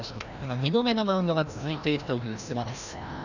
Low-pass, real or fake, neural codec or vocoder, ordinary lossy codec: 7.2 kHz; fake; codec, 16 kHz, 1 kbps, FreqCodec, larger model; none